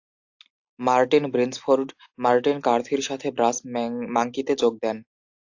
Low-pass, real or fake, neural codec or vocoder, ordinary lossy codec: 7.2 kHz; real; none; AAC, 48 kbps